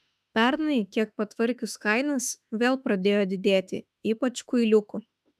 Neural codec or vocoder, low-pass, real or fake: autoencoder, 48 kHz, 32 numbers a frame, DAC-VAE, trained on Japanese speech; 14.4 kHz; fake